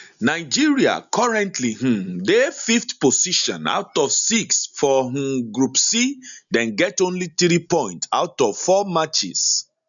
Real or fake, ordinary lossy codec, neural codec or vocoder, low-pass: real; none; none; 7.2 kHz